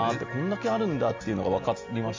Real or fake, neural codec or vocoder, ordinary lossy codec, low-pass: real; none; MP3, 64 kbps; 7.2 kHz